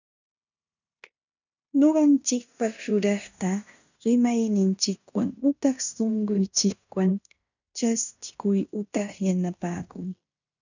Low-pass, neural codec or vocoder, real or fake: 7.2 kHz; codec, 16 kHz in and 24 kHz out, 0.9 kbps, LongCat-Audio-Codec, fine tuned four codebook decoder; fake